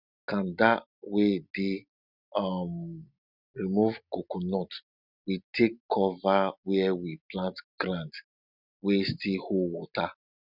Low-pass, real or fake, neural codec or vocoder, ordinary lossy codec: 5.4 kHz; real; none; none